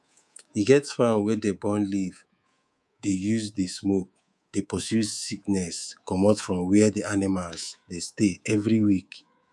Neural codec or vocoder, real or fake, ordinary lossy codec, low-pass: codec, 24 kHz, 3.1 kbps, DualCodec; fake; none; none